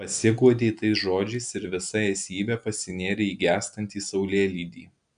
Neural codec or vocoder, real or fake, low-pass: none; real; 9.9 kHz